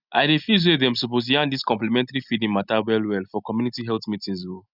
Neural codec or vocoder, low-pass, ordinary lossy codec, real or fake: none; 5.4 kHz; none; real